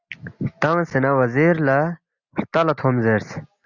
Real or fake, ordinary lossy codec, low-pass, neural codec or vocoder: real; Opus, 64 kbps; 7.2 kHz; none